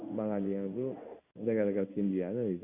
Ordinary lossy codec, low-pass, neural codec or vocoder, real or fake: Opus, 64 kbps; 3.6 kHz; codec, 16 kHz in and 24 kHz out, 1 kbps, XY-Tokenizer; fake